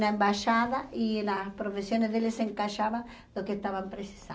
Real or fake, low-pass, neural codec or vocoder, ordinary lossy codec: real; none; none; none